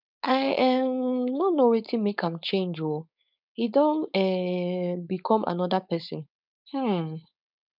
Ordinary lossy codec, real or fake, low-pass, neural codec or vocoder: none; fake; 5.4 kHz; codec, 16 kHz, 4.8 kbps, FACodec